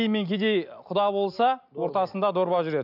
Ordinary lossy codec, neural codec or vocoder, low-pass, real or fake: none; none; 5.4 kHz; real